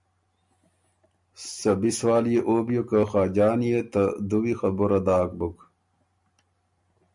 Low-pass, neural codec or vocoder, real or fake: 10.8 kHz; none; real